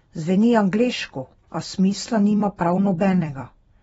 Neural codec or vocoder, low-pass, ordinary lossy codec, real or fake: vocoder, 44.1 kHz, 128 mel bands every 256 samples, BigVGAN v2; 19.8 kHz; AAC, 24 kbps; fake